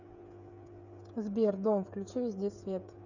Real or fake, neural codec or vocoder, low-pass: fake; codec, 16 kHz, 8 kbps, FreqCodec, smaller model; 7.2 kHz